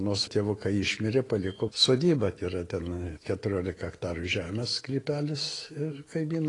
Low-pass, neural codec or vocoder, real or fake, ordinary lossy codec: 10.8 kHz; autoencoder, 48 kHz, 128 numbers a frame, DAC-VAE, trained on Japanese speech; fake; AAC, 32 kbps